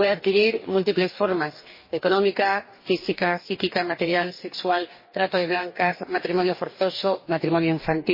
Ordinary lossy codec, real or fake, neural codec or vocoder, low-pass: MP3, 24 kbps; fake; codec, 44.1 kHz, 2.6 kbps, DAC; 5.4 kHz